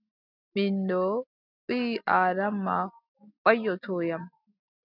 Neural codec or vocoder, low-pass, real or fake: none; 5.4 kHz; real